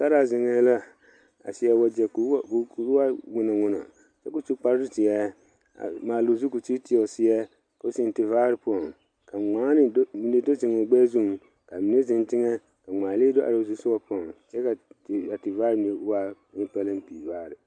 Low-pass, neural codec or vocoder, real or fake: 9.9 kHz; none; real